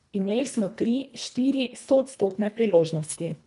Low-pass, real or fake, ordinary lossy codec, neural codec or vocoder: 10.8 kHz; fake; none; codec, 24 kHz, 1.5 kbps, HILCodec